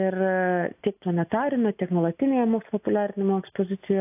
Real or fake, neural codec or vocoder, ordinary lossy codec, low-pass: real; none; AAC, 32 kbps; 3.6 kHz